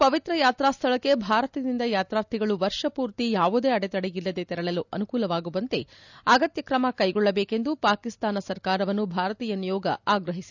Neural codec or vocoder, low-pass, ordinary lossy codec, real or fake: none; 7.2 kHz; none; real